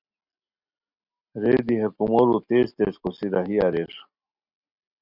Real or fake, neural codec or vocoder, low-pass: real; none; 5.4 kHz